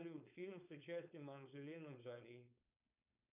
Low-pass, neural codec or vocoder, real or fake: 3.6 kHz; codec, 16 kHz, 4.8 kbps, FACodec; fake